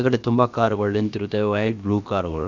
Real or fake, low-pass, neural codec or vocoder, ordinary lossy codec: fake; 7.2 kHz; codec, 16 kHz, about 1 kbps, DyCAST, with the encoder's durations; none